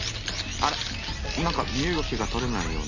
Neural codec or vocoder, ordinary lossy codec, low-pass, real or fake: none; none; 7.2 kHz; real